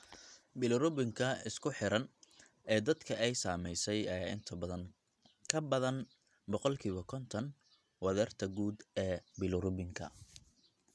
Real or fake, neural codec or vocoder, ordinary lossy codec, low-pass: real; none; none; none